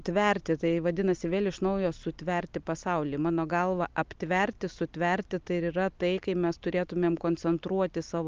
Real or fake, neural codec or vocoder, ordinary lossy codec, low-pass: real; none; Opus, 24 kbps; 7.2 kHz